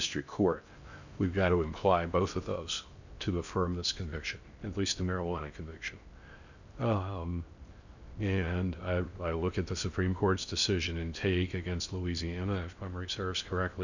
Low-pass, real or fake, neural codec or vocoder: 7.2 kHz; fake; codec, 16 kHz in and 24 kHz out, 0.6 kbps, FocalCodec, streaming, 4096 codes